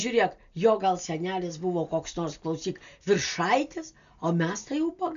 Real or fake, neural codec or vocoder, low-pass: real; none; 7.2 kHz